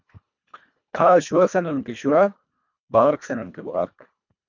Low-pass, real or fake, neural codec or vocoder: 7.2 kHz; fake; codec, 24 kHz, 1.5 kbps, HILCodec